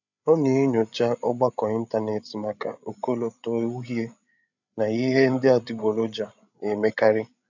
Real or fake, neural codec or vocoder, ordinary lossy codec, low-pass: fake; codec, 16 kHz, 8 kbps, FreqCodec, larger model; none; 7.2 kHz